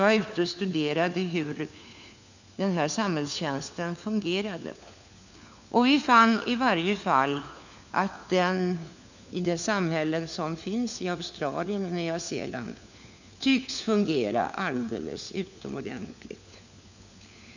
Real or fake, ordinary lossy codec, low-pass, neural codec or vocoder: fake; none; 7.2 kHz; codec, 16 kHz, 4 kbps, FunCodec, trained on LibriTTS, 50 frames a second